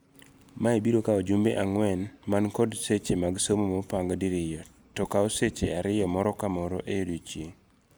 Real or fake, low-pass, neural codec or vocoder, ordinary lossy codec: real; none; none; none